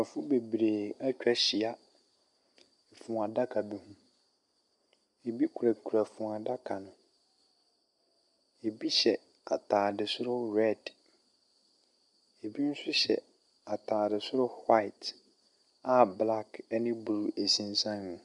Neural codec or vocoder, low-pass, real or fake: none; 10.8 kHz; real